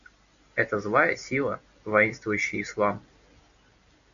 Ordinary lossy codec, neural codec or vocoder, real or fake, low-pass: MP3, 96 kbps; none; real; 7.2 kHz